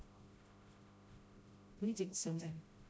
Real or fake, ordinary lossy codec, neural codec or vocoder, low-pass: fake; none; codec, 16 kHz, 0.5 kbps, FreqCodec, smaller model; none